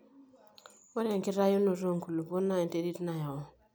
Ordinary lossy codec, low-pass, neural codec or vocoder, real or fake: none; none; none; real